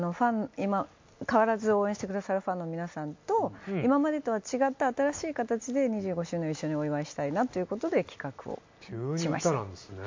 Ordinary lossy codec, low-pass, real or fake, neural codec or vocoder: MP3, 48 kbps; 7.2 kHz; real; none